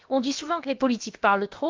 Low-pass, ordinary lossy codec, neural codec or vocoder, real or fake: 7.2 kHz; Opus, 32 kbps; codec, 16 kHz, about 1 kbps, DyCAST, with the encoder's durations; fake